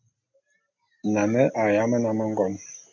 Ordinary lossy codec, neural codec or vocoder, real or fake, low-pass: MP3, 48 kbps; none; real; 7.2 kHz